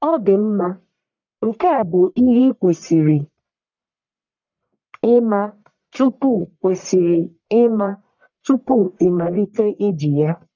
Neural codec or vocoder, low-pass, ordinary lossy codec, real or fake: codec, 44.1 kHz, 1.7 kbps, Pupu-Codec; 7.2 kHz; none; fake